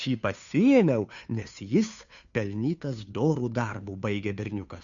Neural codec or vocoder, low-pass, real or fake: codec, 16 kHz, 2 kbps, FunCodec, trained on Chinese and English, 25 frames a second; 7.2 kHz; fake